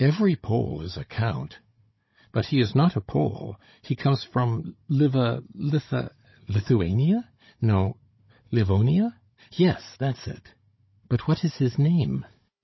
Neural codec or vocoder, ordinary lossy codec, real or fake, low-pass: codec, 16 kHz, 16 kbps, FunCodec, trained on Chinese and English, 50 frames a second; MP3, 24 kbps; fake; 7.2 kHz